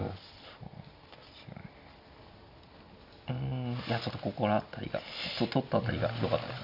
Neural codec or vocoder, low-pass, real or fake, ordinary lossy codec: none; 5.4 kHz; real; none